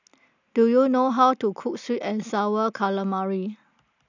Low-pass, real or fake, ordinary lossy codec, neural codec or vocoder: 7.2 kHz; real; none; none